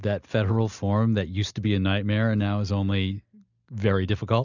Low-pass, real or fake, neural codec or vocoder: 7.2 kHz; real; none